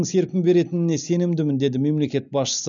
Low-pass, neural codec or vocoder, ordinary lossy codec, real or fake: 7.2 kHz; none; none; real